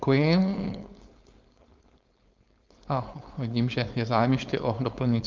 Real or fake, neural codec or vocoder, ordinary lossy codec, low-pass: fake; codec, 16 kHz, 4.8 kbps, FACodec; Opus, 24 kbps; 7.2 kHz